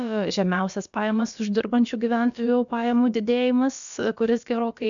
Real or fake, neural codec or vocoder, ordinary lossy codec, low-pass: fake; codec, 16 kHz, about 1 kbps, DyCAST, with the encoder's durations; MP3, 64 kbps; 7.2 kHz